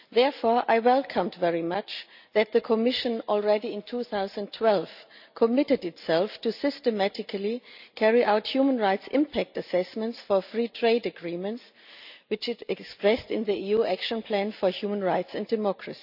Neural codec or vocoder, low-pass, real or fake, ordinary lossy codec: none; 5.4 kHz; real; none